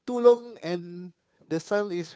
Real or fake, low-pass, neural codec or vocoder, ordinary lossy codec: fake; none; codec, 16 kHz, 2 kbps, FunCodec, trained on Chinese and English, 25 frames a second; none